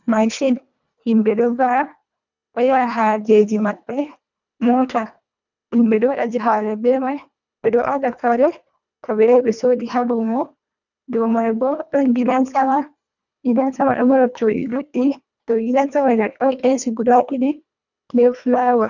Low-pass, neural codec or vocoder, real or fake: 7.2 kHz; codec, 24 kHz, 1.5 kbps, HILCodec; fake